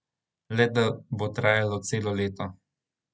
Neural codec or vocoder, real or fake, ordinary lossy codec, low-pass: none; real; none; none